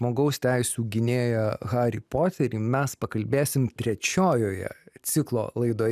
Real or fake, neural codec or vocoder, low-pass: real; none; 14.4 kHz